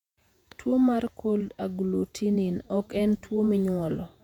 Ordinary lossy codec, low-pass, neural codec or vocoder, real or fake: none; 19.8 kHz; vocoder, 48 kHz, 128 mel bands, Vocos; fake